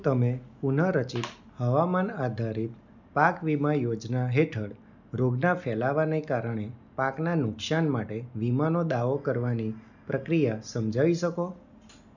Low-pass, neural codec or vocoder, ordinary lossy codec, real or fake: 7.2 kHz; none; none; real